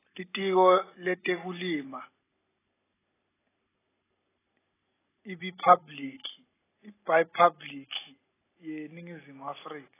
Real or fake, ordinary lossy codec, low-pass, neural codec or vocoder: real; AAC, 16 kbps; 3.6 kHz; none